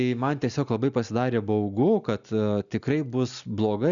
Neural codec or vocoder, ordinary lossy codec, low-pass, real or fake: none; MP3, 96 kbps; 7.2 kHz; real